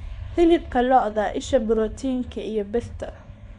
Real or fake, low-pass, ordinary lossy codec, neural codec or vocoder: fake; 10.8 kHz; none; codec, 24 kHz, 0.9 kbps, WavTokenizer, small release